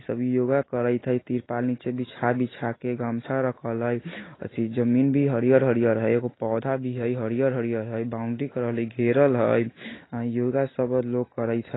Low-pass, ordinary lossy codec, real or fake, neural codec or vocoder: 7.2 kHz; AAC, 16 kbps; real; none